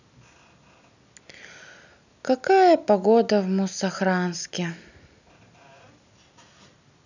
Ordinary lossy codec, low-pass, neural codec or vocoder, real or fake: none; 7.2 kHz; none; real